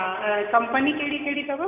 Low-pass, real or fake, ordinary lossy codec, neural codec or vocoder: 3.6 kHz; real; none; none